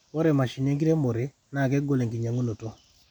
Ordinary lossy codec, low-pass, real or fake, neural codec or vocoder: none; 19.8 kHz; real; none